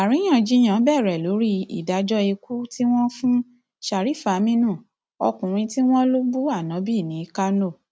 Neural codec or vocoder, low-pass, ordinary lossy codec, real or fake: none; none; none; real